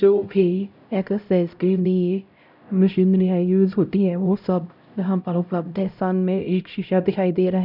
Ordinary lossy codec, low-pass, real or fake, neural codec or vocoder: none; 5.4 kHz; fake; codec, 16 kHz, 0.5 kbps, X-Codec, HuBERT features, trained on LibriSpeech